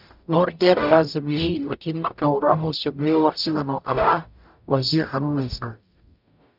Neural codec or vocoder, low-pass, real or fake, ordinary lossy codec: codec, 44.1 kHz, 0.9 kbps, DAC; 5.4 kHz; fake; none